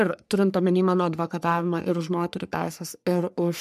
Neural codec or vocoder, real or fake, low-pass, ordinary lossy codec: codec, 44.1 kHz, 3.4 kbps, Pupu-Codec; fake; 14.4 kHz; MP3, 96 kbps